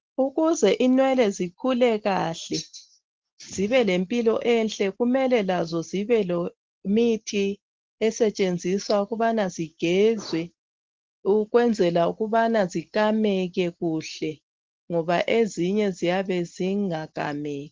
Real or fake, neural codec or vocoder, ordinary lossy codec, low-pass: real; none; Opus, 16 kbps; 7.2 kHz